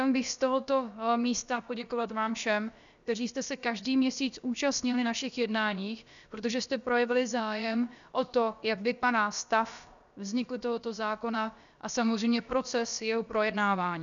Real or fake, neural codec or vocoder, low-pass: fake; codec, 16 kHz, about 1 kbps, DyCAST, with the encoder's durations; 7.2 kHz